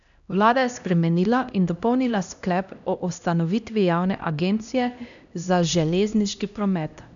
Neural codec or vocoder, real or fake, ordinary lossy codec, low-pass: codec, 16 kHz, 1 kbps, X-Codec, HuBERT features, trained on LibriSpeech; fake; none; 7.2 kHz